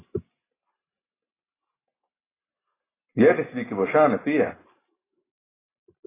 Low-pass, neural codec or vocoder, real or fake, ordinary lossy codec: 3.6 kHz; none; real; AAC, 16 kbps